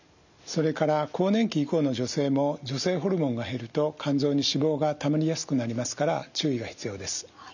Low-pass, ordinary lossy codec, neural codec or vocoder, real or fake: 7.2 kHz; none; none; real